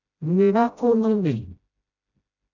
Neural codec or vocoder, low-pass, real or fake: codec, 16 kHz, 0.5 kbps, FreqCodec, smaller model; 7.2 kHz; fake